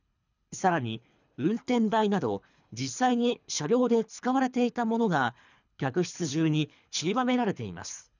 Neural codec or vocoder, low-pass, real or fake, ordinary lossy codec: codec, 24 kHz, 3 kbps, HILCodec; 7.2 kHz; fake; none